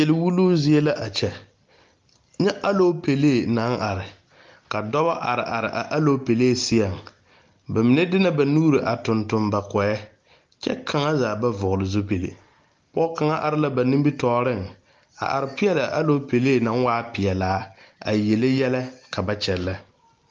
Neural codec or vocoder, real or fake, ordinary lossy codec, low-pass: none; real; Opus, 32 kbps; 7.2 kHz